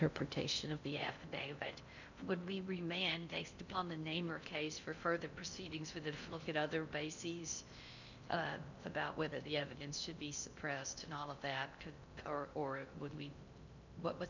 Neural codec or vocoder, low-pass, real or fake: codec, 16 kHz in and 24 kHz out, 0.6 kbps, FocalCodec, streaming, 4096 codes; 7.2 kHz; fake